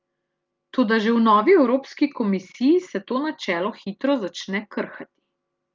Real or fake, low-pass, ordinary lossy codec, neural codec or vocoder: real; 7.2 kHz; Opus, 24 kbps; none